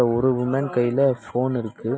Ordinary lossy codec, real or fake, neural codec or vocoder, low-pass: none; real; none; none